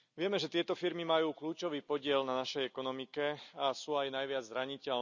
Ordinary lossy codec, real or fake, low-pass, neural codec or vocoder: none; real; 7.2 kHz; none